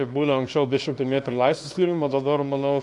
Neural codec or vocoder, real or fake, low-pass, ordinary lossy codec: codec, 24 kHz, 0.9 kbps, WavTokenizer, small release; fake; 10.8 kHz; AAC, 64 kbps